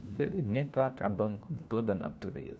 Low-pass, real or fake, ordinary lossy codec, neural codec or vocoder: none; fake; none; codec, 16 kHz, 1 kbps, FunCodec, trained on LibriTTS, 50 frames a second